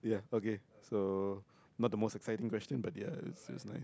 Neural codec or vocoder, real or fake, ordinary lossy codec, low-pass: none; real; none; none